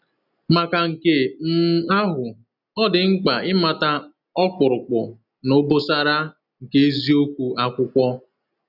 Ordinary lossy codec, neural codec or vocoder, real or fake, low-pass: none; none; real; 5.4 kHz